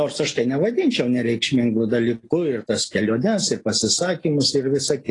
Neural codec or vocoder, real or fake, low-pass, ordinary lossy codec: none; real; 10.8 kHz; AAC, 32 kbps